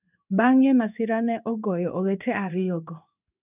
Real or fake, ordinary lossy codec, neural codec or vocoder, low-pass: fake; none; codec, 16 kHz in and 24 kHz out, 1 kbps, XY-Tokenizer; 3.6 kHz